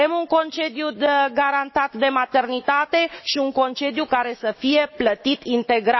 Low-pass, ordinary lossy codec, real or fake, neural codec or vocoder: 7.2 kHz; MP3, 24 kbps; fake; autoencoder, 48 kHz, 128 numbers a frame, DAC-VAE, trained on Japanese speech